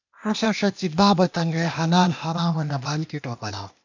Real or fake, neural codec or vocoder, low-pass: fake; codec, 16 kHz, 0.8 kbps, ZipCodec; 7.2 kHz